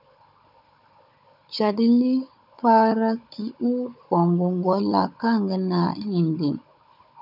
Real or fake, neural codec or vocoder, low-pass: fake; codec, 16 kHz, 16 kbps, FunCodec, trained on Chinese and English, 50 frames a second; 5.4 kHz